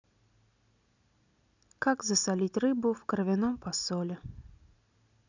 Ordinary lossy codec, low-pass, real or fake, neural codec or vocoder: none; 7.2 kHz; real; none